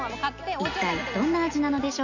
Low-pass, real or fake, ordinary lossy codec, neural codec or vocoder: 7.2 kHz; real; none; none